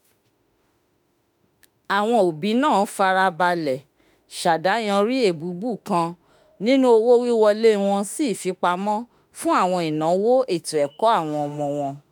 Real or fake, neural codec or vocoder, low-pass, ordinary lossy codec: fake; autoencoder, 48 kHz, 32 numbers a frame, DAC-VAE, trained on Japanese speech; none; none